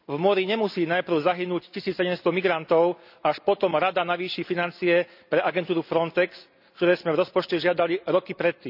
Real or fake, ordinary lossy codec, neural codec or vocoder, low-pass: real; none; none; 5.4 kHz